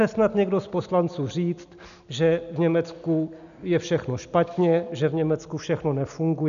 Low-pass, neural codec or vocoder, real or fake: 7.2 kHz; none; real